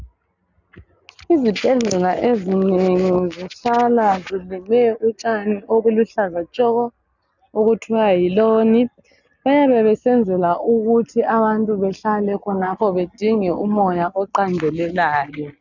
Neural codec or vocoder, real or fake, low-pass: none; real; 7.2 kHz